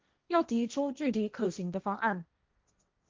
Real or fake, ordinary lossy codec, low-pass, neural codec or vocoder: fake; Opus, 32 kbps; 7.2 kHz; codec, 16 kHz, 1.1 kbps, Voila-Tokenizer